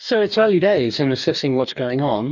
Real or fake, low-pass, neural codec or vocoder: fake; 7.2 kHz; codec, 44.1 kHz, 2.6 kbps, DAC